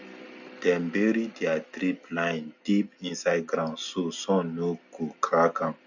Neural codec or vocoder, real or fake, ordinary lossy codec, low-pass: none; real; none; 7.2 kHz